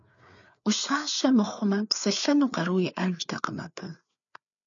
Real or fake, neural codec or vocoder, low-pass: fake; codec, 16 kHz, 4 kbps, FreqCodec, larger model; 7.2 kHz